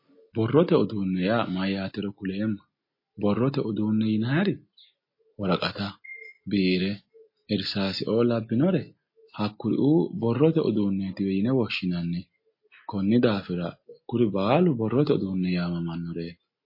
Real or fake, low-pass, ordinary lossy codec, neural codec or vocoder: real; 5.4 kHz; MP3, 24 kbps; none